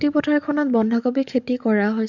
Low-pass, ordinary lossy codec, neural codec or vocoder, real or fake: 7.2 kHz; none; codec, 44.1 kHz, 7.8 kbps, DAC; fake